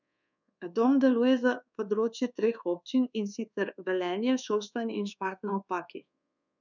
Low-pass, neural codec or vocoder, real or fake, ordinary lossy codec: 7.2 kHz; codec, 24 kHz, 1.2 kbps, DualCodec; fake; none